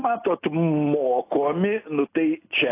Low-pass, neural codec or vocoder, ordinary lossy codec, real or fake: 3.6 kHz; none; AAC, 24 kbps; real